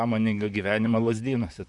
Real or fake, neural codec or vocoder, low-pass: fake; vocoder, 44.1 kHz, 128 mel bands, Pupu-Vocoder; 10.8 kHz